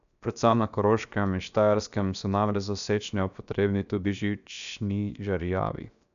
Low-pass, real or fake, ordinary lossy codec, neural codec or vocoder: 7.2 kHz; fake; none; codec, 16 kHz, 0.7 kbps, FocalCodec